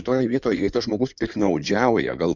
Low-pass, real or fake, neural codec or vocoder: 7.2 kHz; fake; codec, 16 kHz in and 24 kHz out, 2.2 kbps, FireRedTTS-2 codec